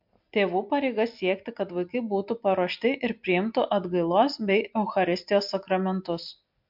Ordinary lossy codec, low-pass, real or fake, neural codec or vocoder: MP3, 48 kbps; 5.4 kHz; real; none